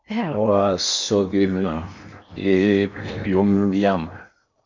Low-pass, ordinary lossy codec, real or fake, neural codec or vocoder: 7.2 kHz; MP3, 64 kbps; fake; codec, 16 kHz in and 24 kHz out, 0.8 kbps, FocalCodec, streaming, 65536 codes